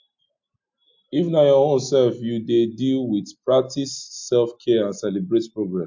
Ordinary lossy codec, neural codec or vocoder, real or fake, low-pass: MP3, 48 kbps; none; real; 7.2 kHz